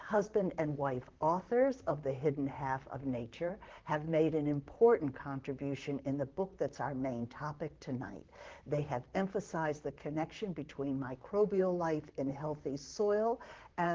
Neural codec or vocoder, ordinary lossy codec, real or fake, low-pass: vocoder, 44.1 kHz, 128 mel bands, Pupu-Vocoder; Opus, 16 kbps; fake; 7.2 kHz